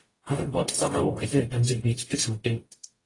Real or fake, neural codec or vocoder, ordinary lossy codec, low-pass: fake; codec, 44.1 kHz, 0.9 kbps, DAC; AAC, 32 kbps; 10.8 kHz